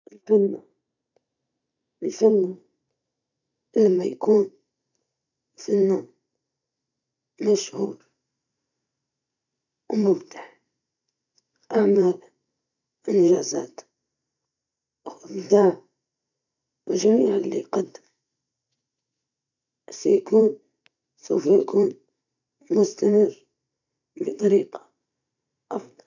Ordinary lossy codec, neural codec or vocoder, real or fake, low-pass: none; vocoder, 44.1 kHz, 128 mel bands every 512 samples, BigVGAN v2; fake; 7.2 kHz